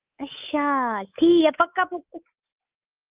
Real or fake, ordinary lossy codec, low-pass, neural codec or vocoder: fake; Opus, 24 kbps; 3.6 kHz; codec, 24 kHz, 3.1 kbps, DualCodec